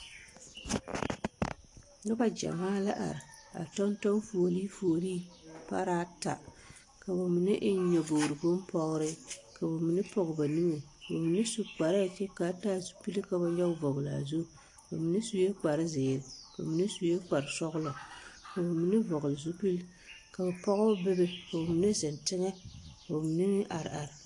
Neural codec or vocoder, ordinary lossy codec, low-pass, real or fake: none; AAC, 48 kbps; 10.8 kHz; real